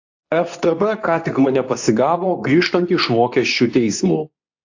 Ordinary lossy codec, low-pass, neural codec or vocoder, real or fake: AAC, 48 kbps; 7.2 kHz; codec, 24 kHz, 0.9 kbps, WavTokenizer, medium speech release version 2; fake